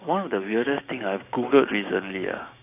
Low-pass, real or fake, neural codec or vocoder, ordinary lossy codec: 3.6 kHz; fake; codec, 16 kHz, 6 kbps, DAC; AAC, 24 kbps